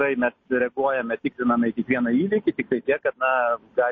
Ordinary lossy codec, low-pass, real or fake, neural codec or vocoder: MP3, 32 kbps; 7.2 kHz; real; none